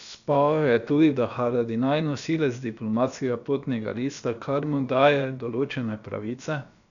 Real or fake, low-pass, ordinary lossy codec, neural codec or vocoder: fake; 7.2 kHz; none; codec, 16 kHz, about 1 kbps, DyCAST, with the encoder's durations